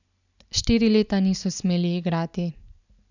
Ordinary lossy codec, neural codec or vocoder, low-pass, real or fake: none; none; 7.2 kHz; real